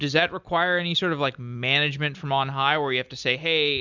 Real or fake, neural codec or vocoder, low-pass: real; none; 7.2 kHz